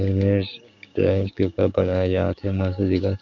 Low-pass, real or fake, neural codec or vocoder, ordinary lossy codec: 7.2 kHz; fake; codec, 16 kHz, 6 kbps, DAC; none